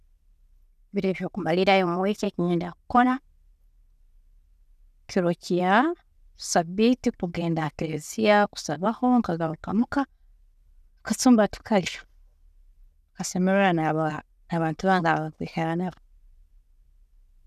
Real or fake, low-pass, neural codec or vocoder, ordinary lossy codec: real; 14.4 kHz; none; none